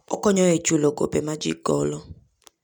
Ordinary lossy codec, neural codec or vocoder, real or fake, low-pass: none; none; real; 19.8 kHz